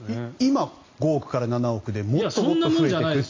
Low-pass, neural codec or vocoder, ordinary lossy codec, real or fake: 7.2 kHz; none; AAC, 32 kbps; real